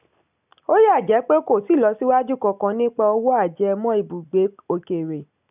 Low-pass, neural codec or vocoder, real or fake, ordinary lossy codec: 3.6 kHz; none; real; none